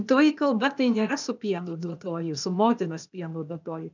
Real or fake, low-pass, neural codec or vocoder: fake; 7.2 kHz; codec, 16 kHz, 0.8 kbps, ZipCodec